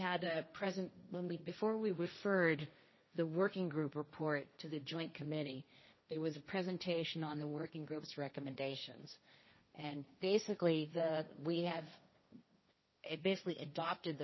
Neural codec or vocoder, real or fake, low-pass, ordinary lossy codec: codec, 16 kHz, 1.1 kbps, Voila-Tokenizer; fake; 7.2 kHz; MP3, 24 kbps